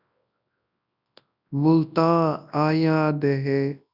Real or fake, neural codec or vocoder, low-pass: fake; codec, 24 kHz, 0.9 kbps, WavTokenizer, large speech release; 5.4 kHz